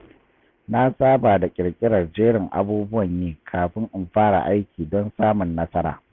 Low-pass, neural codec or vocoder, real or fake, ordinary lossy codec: none; none; real; none